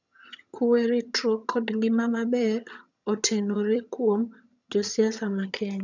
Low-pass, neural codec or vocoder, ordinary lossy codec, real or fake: 7.2 kHz; vocoder, 22.05 kHz, 80 mel bands, HiFi-GAN; none; fake